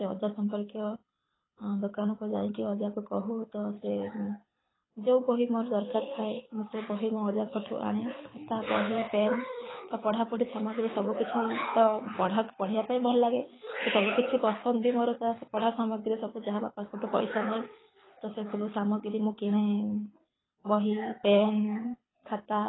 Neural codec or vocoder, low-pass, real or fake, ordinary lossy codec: codec, 24 kHz, 6 kbps, HILCodec; 7.2 kHz; fake; AAC, 16 kbps